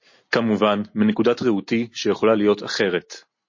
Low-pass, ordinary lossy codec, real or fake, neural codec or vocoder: 7.2 kHz; MP3, 32 kbps; real; none